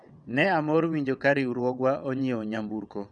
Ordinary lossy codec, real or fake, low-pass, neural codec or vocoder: none; fake; 9.9 kHz; vocoder, 22.05 kHz, 80 mel bands, WaveNeXt